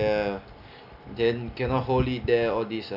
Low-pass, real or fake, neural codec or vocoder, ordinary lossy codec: 5.4 kHz; real; none; none